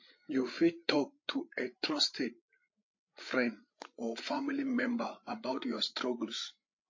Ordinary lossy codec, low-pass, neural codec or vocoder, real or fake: MP3, 32 kbps; 7.2 kHz; codec, 16 kHz, 8 kbps, FreqCodec, larger model; fake